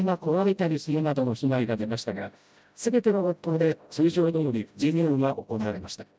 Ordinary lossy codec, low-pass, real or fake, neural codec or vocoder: none; none; fake; codec, 16 kHz, 0.5 kbps, FreqCodec, smaller model